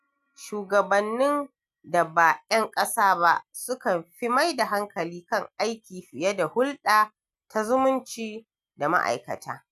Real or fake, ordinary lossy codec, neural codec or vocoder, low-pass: real; none; none; 14.4 kHz